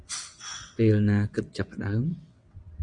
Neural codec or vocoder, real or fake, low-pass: vocoder, 22.05 kHz, 80 mel bands, WaveNeXt; fake; 9.9 kHz